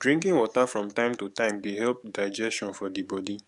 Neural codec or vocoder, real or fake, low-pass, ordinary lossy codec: codec, 44.1 kHz, 7.8 kbps, DAC; fake; 10.8 kHz; none